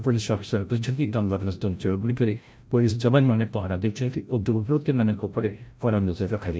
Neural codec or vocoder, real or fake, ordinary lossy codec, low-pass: codec, 16 kHz, 0.5 kbps, FreqCodec, larger model; fake; none; none